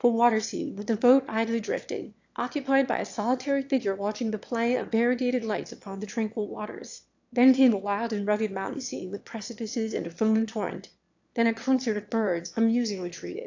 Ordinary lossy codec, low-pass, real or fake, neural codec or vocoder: AAC, 48 kbps; 7.2 kHz; fake; autoencoder, 22.05 kHz, a latent of 192 numbers a frame, VITS, trained on one speaker